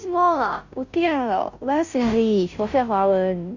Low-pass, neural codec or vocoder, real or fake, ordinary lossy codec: 7.2 kHz; codec, 16 kHz, 0.5 kbps, FunCodec, trained on Chinese and English, 25 frames a second; fake; Opus, 64 kbps